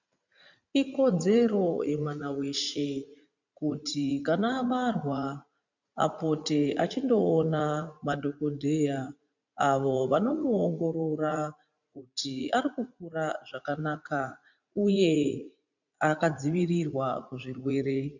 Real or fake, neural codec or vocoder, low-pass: fake; vocoder, 44.1 kHz, 80 mel bands, Vocos; 7.2 kHz